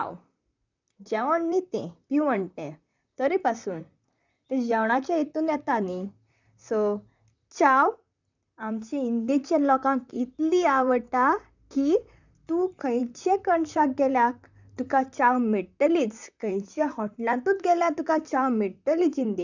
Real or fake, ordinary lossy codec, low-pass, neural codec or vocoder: fake; none; 7.2 kHz; vocoder, 44.1 kHz, 128 mel bands, Pupu-Vocoder